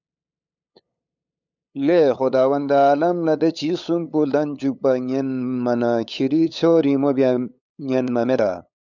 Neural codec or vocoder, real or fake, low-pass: codec, 16 kHz, 8 kbps, FunCodec, trained on LibriTTS, 25 frames a second; fake; 7.2 kHz